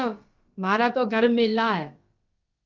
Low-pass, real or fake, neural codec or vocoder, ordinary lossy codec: 7.2 kHz; fake; codec, 16 kHz, about 1 kbps, DyCAST, with the encoder's durations; Opus, 24 kbps